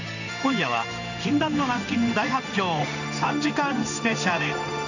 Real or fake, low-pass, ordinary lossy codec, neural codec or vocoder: fake; 7.2 kHz; none; codec, 16 kHz in and 24 kHz out, 1 kbps, XY-Tokenizer